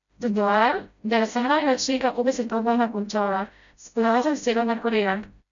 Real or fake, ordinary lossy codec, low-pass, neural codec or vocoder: fake; none; 7.2 kHz; codec, 16 kHz, 0.5 kbps, FreqCodec, smaller model